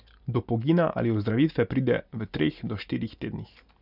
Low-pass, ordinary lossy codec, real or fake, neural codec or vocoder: 5.4 kHz; none; real; none